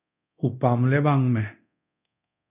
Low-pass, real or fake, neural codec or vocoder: 3.6 kHz; fake; codec, 24 kHz, 0.9 kbps, DualCodec